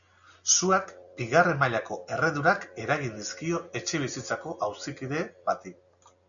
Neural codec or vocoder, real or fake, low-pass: none; real; 7.2 kHz